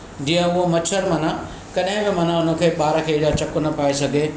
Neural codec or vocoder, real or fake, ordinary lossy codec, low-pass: none; real; none; none